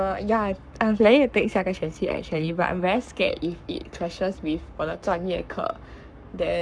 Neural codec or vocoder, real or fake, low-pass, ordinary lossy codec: codec, 44.1 kHz, 7.8 kbps, Pupu-Codec; fake; 9.9 kHz; MP3, 96 kbps